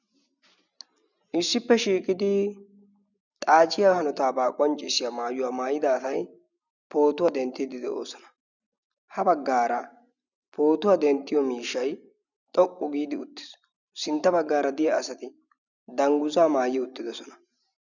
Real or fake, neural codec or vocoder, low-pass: real; none; 7.2 kHz